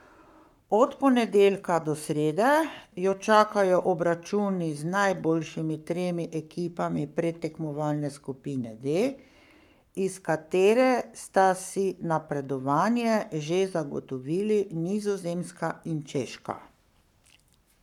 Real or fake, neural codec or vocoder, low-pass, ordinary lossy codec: fake; codec, 44.1 kHz, 7.8 kbps, Pupu-Codec; 19.8 kHz; none